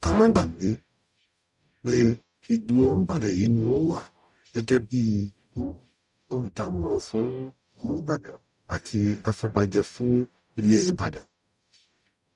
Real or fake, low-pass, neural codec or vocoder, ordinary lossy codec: fake; 10.8 kHz; codec, 44.1 kHz, 0.9 kbps, DAC; none